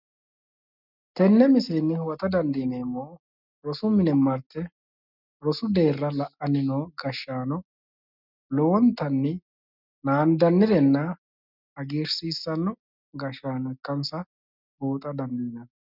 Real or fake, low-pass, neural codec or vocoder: real; 5.4 kHz; none